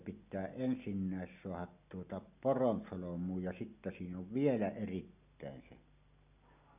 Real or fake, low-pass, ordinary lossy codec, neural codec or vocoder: real; 3.6 kHz; none; none